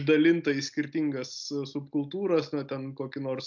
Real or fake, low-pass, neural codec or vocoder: real; 7.2 kHz; none